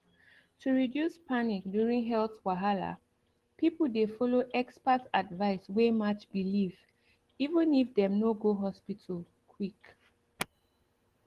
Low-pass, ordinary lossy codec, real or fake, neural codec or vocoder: 14.4 kHz; Opus, 16 kbps; real; none